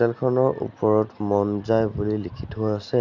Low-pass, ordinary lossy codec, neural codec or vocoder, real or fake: 7.2 kHz; none; none; real